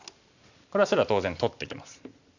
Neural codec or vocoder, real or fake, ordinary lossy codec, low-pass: none; real; none; 7.2 kHz